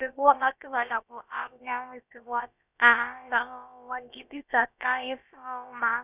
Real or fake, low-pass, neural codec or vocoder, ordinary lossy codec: fake; 3.6 kHz; codec, 16 kHz, about 1 kbps, DyCAST, with the encoder's durations; none